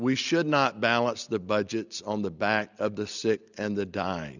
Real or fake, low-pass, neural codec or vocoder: real; 7.2 kHz; none